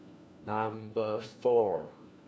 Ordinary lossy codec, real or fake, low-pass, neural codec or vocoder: none; fake; none; codec, 16 kHz, 1 kbps, FunCodec, trained on LibriTTS, 50 frames a second